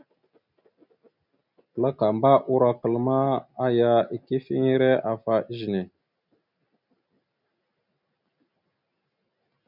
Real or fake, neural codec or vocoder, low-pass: real; none; 5.4 kHz